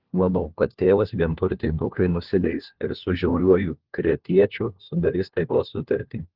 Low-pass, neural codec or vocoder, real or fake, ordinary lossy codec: 5.4 kHz; codec, 16 kHz, 1 kbps, FunCodec, trained on LibriTTS, 50 frames a second; fake; Opus, 16 kbps